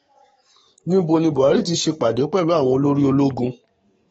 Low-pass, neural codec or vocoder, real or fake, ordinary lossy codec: 19.8 kHz; codec, 44.1 kHz, 7.8 kbps, DAC; fake; AAC, 24 kbps